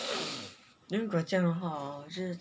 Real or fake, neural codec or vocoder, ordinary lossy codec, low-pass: real; none; none; none